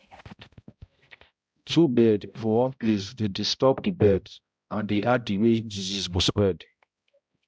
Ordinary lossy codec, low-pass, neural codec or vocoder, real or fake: none; none; codec, 16 kHz, 0.5 kbps, X-Codec, HuBERT features, trained on balanced general audio; fake